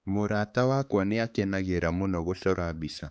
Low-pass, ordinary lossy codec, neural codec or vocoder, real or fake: none; none; codec, 16 kHz, 4 kbps, X-Codec, HuBERT features, trained on balanced general audio; fake